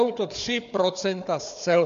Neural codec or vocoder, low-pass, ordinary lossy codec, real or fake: codec, 16 kHz, 4 kbps, FunCodec, trained on Chinese and English, 50 frames a second; 7.2 kHz; MP3, 64 kbps; fake